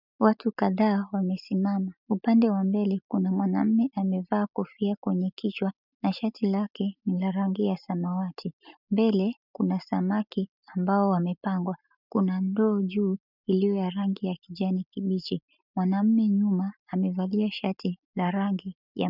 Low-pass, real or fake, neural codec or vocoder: 5.4 kHz; real; none